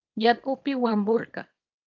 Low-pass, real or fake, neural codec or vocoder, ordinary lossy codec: 7.2 kHz; fake; codec, 24 kHz, 0.9 kbps, WavTokenizer, small release; Opus, 32 kbps